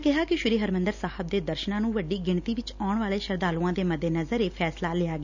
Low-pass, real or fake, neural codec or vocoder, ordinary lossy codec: 7.2 kHz; real; none; none